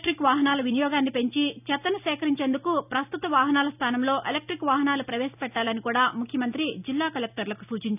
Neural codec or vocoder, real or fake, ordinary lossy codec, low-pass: none; real; none; 3.6 kHz